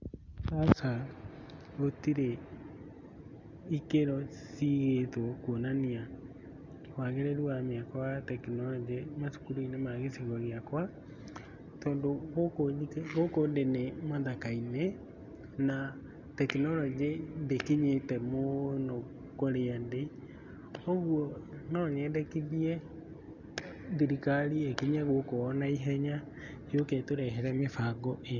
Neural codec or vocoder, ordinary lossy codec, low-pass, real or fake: none; none; 7.2 kHz; real